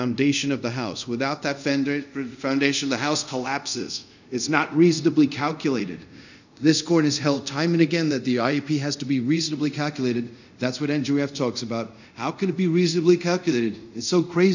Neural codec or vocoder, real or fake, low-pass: codec, 24 kHz, 0.5 kbps, DualCodec; fake; 7.2 kHz